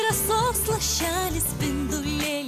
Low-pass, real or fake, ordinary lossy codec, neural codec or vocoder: 14.4 kHz; real; AAC, 96 kbps; none